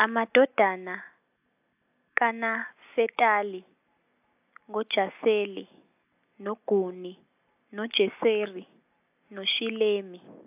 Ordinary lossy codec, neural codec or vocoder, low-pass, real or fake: AAC, 32 kbps; none; 3.6 kHz; real